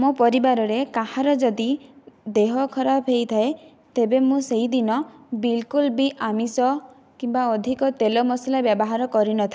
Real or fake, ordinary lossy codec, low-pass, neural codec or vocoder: real; none; none; none